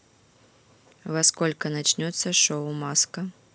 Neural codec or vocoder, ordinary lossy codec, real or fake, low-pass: none; none; real; none